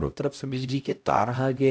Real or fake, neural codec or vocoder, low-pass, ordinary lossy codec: fake; codec, 16 kHz, 0.5 kbps, X-Codec, HuBERT features, trained on LibriSpeech; none; none